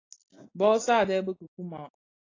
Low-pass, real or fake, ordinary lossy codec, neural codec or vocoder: 7.2 kHz; real; AAC, 32 kbps; none